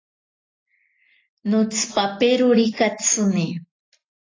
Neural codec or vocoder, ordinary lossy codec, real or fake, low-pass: none; AAC, 32 kbps; real; 7.2 kHz